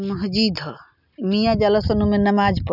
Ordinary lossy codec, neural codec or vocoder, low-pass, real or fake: none; none; 5.4 kHz; real